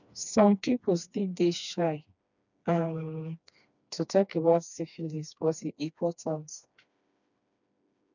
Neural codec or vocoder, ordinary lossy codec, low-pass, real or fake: codec, 16 kHz, 2 kbps, FreqCodec, smaller model; none; 7.2 kHz; fake